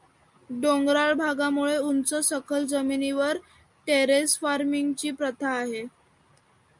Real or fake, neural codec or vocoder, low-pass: real; none; 10.8 kHz